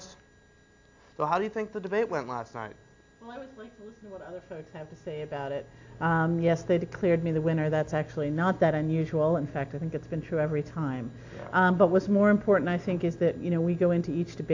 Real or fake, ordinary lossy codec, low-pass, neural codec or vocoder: real; AAC, 48 kbps; 7.2 kHz; none